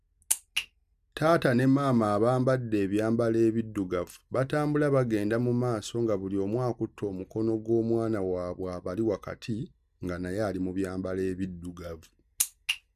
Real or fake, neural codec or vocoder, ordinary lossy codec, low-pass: real; none; none; 14.4 kHz